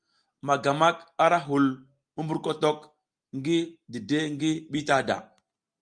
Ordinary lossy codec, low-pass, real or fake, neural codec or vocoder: Opus, 32 kbps; 9.9 kHz; real; none